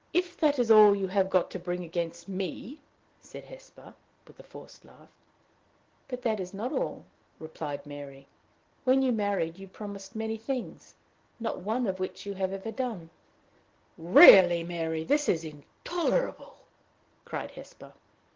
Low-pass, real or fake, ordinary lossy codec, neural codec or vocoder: 7.2 kHz; real; Opus, 16 kbps; none